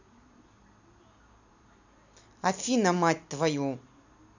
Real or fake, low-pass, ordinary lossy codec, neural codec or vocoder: real; 7.2 kHz; none; none